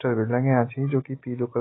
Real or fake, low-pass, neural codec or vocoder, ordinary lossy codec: real; 7.2 kHz; none; AAC, 16 kbps